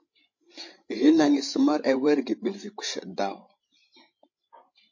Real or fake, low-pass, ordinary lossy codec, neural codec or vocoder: fake; 7.2 kHz; MP3, 32 kbps; codec, 16 kHz, 16 kbps, FreqCodec, larger model